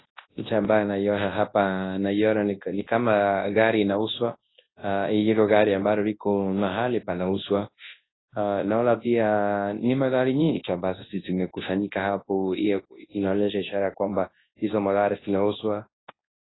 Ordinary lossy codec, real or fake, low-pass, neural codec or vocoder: AAC, 16 kbps; fake; 7.2 kHz; codec, 24 kHz, 0.9 kbps, WavTokenizer, large speech release